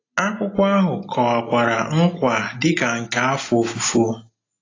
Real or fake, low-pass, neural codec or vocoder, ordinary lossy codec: real; 7.2 kHz; none; AAC, 32 kbps